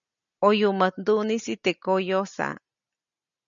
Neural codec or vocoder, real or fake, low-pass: none; real; 7.2 kHz